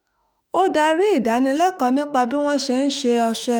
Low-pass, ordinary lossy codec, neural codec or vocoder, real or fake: none; none; autoencoder, 48 kHz, 32 numbers a frame, DAC-VAE, trained on Japanese speech; fake